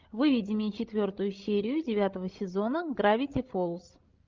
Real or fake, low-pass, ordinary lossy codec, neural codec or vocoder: fake; 7.2 kHz; Opus, 24 kbps; codec, 16 kHz, 16 kbps, FreqCodec, larger model